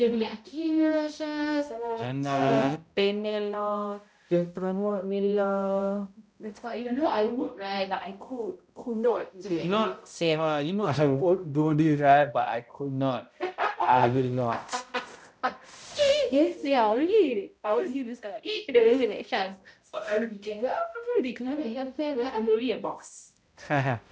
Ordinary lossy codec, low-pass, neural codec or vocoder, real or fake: none; none; codec, 16 kHz, 0.5 kbps, X-Codec, HuBERT features, trained on balanced general audio; fake